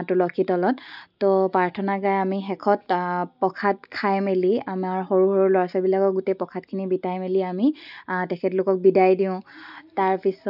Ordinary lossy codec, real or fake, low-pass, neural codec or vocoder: none; real; 5.4 kHz; none